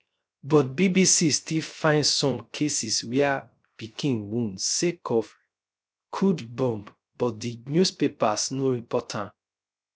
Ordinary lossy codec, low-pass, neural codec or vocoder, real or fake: none; none; codec, 16 kHz, 0.3 kbps, FocalCodec; fake